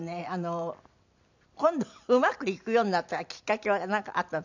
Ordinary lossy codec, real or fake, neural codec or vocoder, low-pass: none; fake; vocoder, 44.1 kHz, 128 mel bands every 256 samples, BigVGAN v2; 7.2 kHz